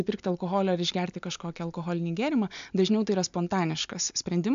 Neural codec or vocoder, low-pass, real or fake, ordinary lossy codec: none; 7.2 kHz; real; MP3, 64 kbps